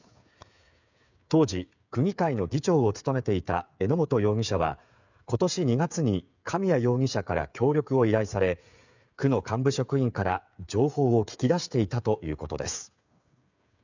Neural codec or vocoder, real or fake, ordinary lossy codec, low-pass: codec, 16 kHz, 8 kbps, FreqCodec, smaller model; fake; none; 7.2 kHz